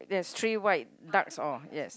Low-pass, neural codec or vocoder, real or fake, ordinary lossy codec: none; none; real; none